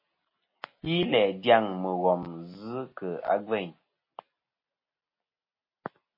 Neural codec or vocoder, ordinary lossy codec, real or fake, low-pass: none; MP3, 24 kbps; real; 5.4 kHz